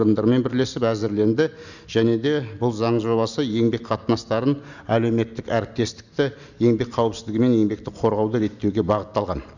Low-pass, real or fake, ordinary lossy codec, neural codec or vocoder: 7.2 kHz; real; none; none